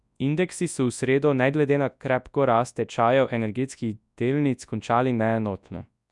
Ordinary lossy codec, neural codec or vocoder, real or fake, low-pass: none; codec, 24 kHz, 0.9 kbps, WavTokenizer, large speech release; fake; 10.8 kHz